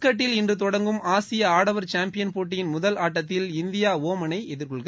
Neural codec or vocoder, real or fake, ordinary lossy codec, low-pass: none; real; none; none